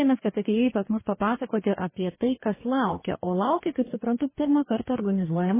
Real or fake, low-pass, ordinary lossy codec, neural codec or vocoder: fake; 3.6 kHz; MP3, 16 kbps; codec, 44.1 kHz, 2.6 kbps, DAC